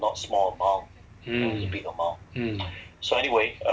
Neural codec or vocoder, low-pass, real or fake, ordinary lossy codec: none; none; real; none